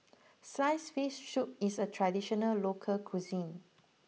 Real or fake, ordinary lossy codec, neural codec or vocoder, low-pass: real; none; none; none